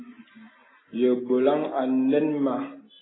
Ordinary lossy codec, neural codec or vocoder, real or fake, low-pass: AAC, 16 kbps; none; real; 7.2 kHz